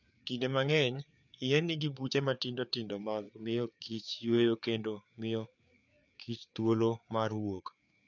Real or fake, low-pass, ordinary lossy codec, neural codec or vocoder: fake; 7.2 kHz; none; codec, 16 kHz, 4 kbps, FreqCodec, larger model